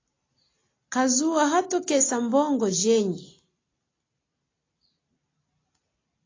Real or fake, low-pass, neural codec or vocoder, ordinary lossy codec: real; 7.2 kHz; none; AAC, 32 kbps